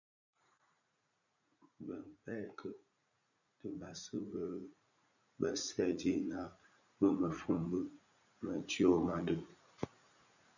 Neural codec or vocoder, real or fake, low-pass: vocoder, 44.1 kHz, 80 mel bands, Vocos; fake; 7.2 kHz